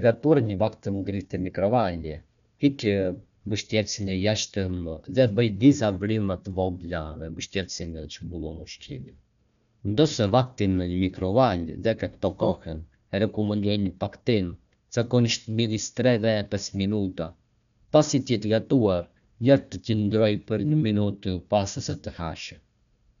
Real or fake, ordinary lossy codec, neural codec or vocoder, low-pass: fake; none; codec, 16 kHz, 1 kbps, FunCodec, trained on Chinese and English, 50 frames a second; 7.2 kHz